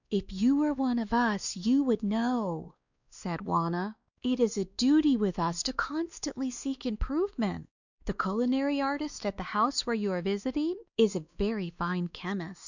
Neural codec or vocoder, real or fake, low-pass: codec, 16 kHz, 2 kbps, X-Codec, WavLM features, trained on Multilingual LibriSpeech; fake; 7.2 kHz